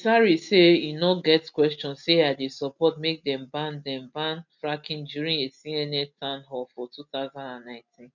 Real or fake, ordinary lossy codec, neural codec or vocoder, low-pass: real; none; none; 7.2 kHz